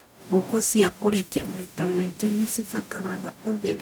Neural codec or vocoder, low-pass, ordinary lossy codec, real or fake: codec, 44.1 kHz, 0.9 kbps, DAC; none; none; fake